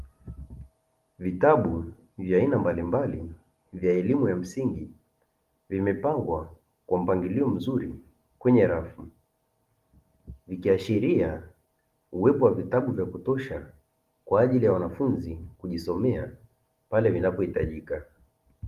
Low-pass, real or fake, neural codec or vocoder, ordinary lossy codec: 14.4 kHz; real; none; Opus, 24 kbps